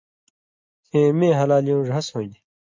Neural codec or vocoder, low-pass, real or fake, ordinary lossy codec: none; 7.2 kHz; real; MP3, 48 kbps